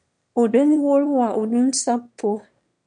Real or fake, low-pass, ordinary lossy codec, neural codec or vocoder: fake; 9.9 kHz; MP3, 64 kbps; autoencoder, 22.05 kHz, a latent of 192 numbers a frame, VITS, trained on one speaker